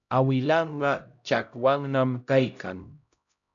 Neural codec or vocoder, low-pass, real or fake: codec, 16 kHz, 0.5 kbps, X-Codec, HuBERT features, trained on LibriSpeech; 7.2 kHz; fake